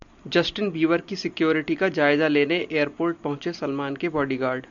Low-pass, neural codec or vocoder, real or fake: 7.2 kHz; none; real